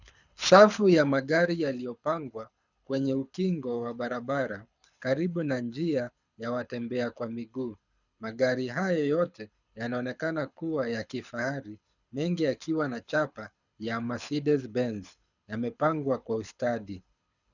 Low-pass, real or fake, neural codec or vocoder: 7.2 kHz; fake; codec, 24 kHz, 6 kbps, HILCodec